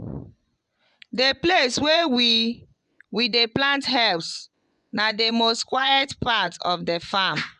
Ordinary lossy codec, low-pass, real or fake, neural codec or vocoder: none; 10.8 kHz; fake; vocoder, 24 kHz, 100 mel bands, Vocos